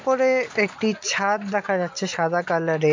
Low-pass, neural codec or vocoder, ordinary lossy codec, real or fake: 7.2 kHz; none; MP3, 64 kbps; real